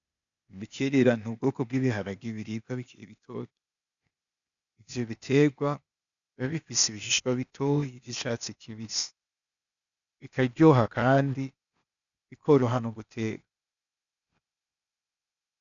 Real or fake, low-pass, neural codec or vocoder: fake; 7.2 kHz; codec, 16 kHz, 0.8 kbps, ZipCodec